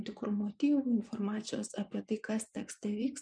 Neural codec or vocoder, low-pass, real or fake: vocoder, 24 kHz, 100 mel bands, Vocos; 9.9 kHz; fake